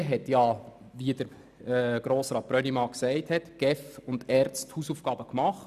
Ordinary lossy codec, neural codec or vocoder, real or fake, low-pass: none; none; real; 14.4 kHz